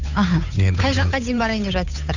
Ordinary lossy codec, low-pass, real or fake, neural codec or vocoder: none; 7.2 kHz; fake; codec, 16 kHz, 8 kbps, FunCodec, trained on Chinese and English, 25 frames a second